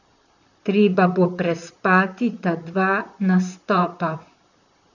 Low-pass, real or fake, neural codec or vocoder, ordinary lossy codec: 7.2 kHz; fake; vocoder, 44.1 kHz, 128 mel bands, Pupu-Vocoder; none